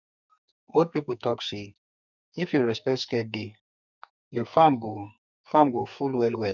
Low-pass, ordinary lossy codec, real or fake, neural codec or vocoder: 7.2 kHz; none; fake; codec, 44.1 kHz, 2.6 kbps, SNAC